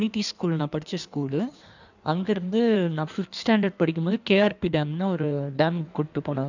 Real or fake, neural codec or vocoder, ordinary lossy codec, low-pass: fake; codec, 16 kHz in and 24 kHz out, 1.1 kbps, FireRedTTS-2 codec; none; 7.2 kHz